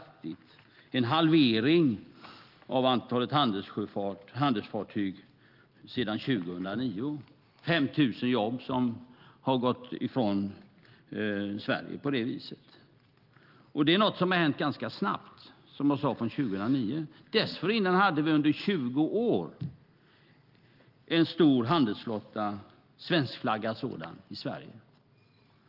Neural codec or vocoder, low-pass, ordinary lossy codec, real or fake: none; 5.4 kHz; Opus, 32 kbps; real